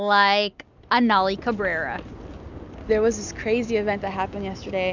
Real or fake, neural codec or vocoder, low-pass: real; none; 7.2 kHz